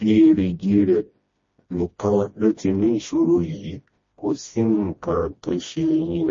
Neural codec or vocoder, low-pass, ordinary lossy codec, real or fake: codec, 16 kHz, 1 kbps, FreqCodec, smaller model; 7.2 kHz; MP3, 32 kbps; fake